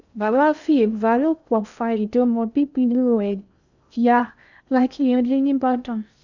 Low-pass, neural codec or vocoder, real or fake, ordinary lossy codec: 7.2 kHz; codec, 16 kHz in and 24 kHz out, 0.6 kbps, FocalCodec, streaming, 2048 codes; fake; none